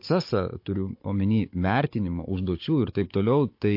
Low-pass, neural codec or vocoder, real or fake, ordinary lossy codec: 5.4 kHz; codec, 16 kHz, 16 kbps, FunCodec, trained on Chinese and English, 50 frames a second; fake; MP3, 32 kbps